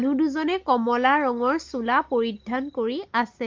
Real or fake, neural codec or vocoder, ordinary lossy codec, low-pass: real; none; Opus, 32 kbps; 7.2 kHz